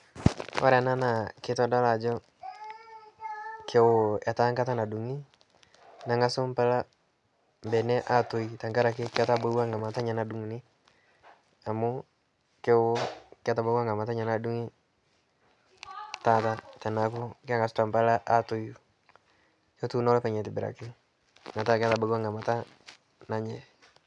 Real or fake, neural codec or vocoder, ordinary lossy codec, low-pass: real; none; none; 10.8 kHz